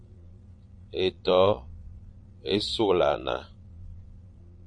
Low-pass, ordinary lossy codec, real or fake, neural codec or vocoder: 9.9 kHz; MP3, 48 kbps; fake; vocoder, 22.05 kHz, 80 mel bands, Vocos